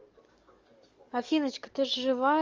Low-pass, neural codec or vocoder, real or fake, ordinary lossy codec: 7.2 kHz; codec, 44.1 kHz, 7.8 kbps, DAC; fake; Opus, 32 kbps